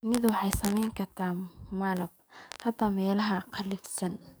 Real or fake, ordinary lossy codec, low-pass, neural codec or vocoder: fake; none; none; codec, 44.1 kHz, 7.8 kbps, DAC